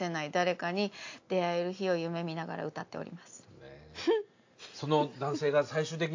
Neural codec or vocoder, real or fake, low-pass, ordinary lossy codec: none; real; 7.2 kHz; AAC, 48 kbps